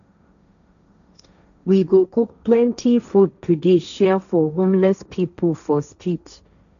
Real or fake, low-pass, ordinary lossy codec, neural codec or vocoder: fake; 7.2 kHz; none; codec, 16 kHz, 1.1 kbps, Voila-Tokenizer